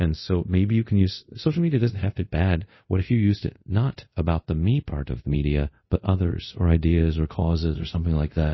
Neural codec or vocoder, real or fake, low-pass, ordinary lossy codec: codec, 24 kHz, 0.5 kbps, DualCodec; fake; 7.2 kHz; MP3, 24 kbps